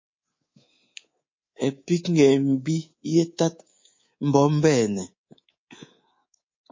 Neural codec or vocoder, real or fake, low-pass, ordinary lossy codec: codec, 24 kHz, 3.1 kbps, DualCodec; fake; 7.2 kHz; MP3, 32 kbps